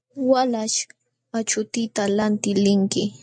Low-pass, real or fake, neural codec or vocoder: 9.9 kHz; real; none